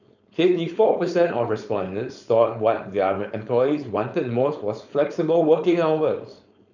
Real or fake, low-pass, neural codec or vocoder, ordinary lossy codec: fake; 7.2 kHz; codec, 16 kHz, 4.8 kbps, FACodec; none